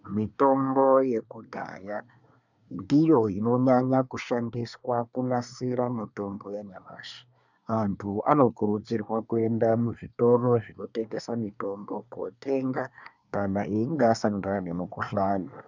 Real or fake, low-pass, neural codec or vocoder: fake; 7.2 kHz; codec, 24 kHz, 1 kbps, SNAC